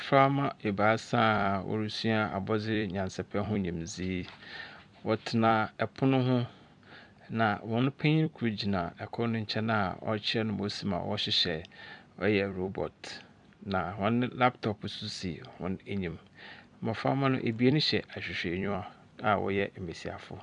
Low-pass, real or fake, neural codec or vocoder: 10.8 kHz; fake; vocoder, 44.1 kHz, 128 mel bands every 256 samples, BigVGAN v2